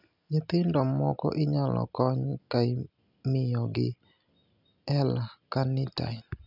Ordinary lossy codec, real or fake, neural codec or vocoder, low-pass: none; real; none; 5.4 kHz